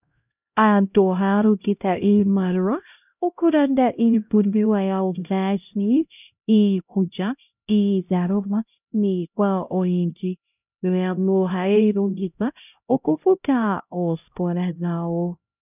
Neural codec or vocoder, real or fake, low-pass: codec, 16 kHz, 0.5 kbps, X-Codec, HuBERT features, trained on LibriSpeech; fake; 3.6 kHz